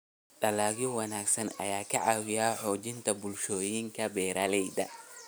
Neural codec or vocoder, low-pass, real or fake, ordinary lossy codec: none; none; real; none